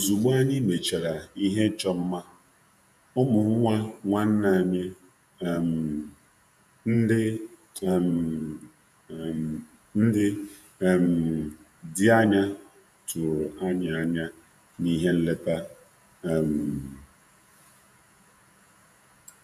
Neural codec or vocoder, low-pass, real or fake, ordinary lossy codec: vocoder, 48 kHz, 128 mel bands, Vocos; 14.4 kHz; fake; none